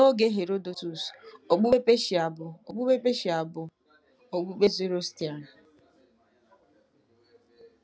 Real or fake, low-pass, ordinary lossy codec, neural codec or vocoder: real; none; none; none